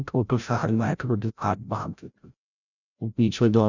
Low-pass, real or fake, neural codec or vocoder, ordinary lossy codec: 7.2 kHz; fake; codec, 16 kHz, 0.5 kbps, FreqCodec, larger model; none